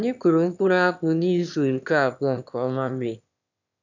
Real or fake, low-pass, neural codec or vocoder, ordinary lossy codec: fake; 7.2 kHz; autoencoder, 22.05 kHz, a latent of 192 numbers a frame, VITS, trained on one speaker; none